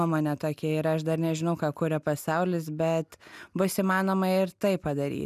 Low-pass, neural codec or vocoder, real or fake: 14.4 kHz; none; real